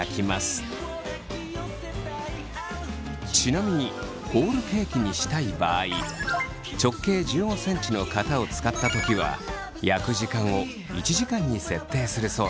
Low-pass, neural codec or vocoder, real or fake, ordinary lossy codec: none; none; real; none